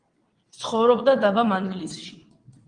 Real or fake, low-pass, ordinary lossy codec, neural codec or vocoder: fake; 9.9 kHz; Opus, 24 kbps; vocoder, 22.05 kHz, 80 mel bands, WaveNeXt